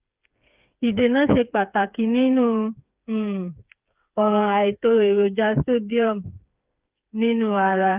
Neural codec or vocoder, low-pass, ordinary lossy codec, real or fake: codec, 16 kHz, 4 kbps, FreqCodec, smaller model; 3.6 kHz; Opus, 32 kbps; fake